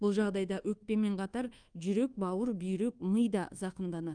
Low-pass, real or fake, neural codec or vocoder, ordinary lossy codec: 9.9 kHz; fake; codec, 24 kHz, 1.2 kbps, DualCodec; Opus, 32 kbps